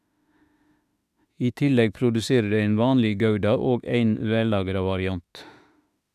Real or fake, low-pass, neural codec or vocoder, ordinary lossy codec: fake; 14.4 kHz; autoencoder, 48 kHz, 32 numbers a frame, DAC-VAE, trained on Japanese speech; none